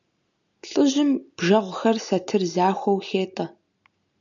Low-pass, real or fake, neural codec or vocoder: 7.2 kHz; real; none